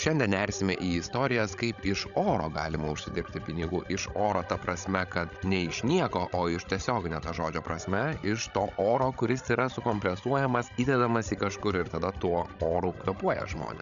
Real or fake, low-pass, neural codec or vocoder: fake; 7.2 kHz; codec, 16 kHz, 16 kbps, FreqCodec, larger model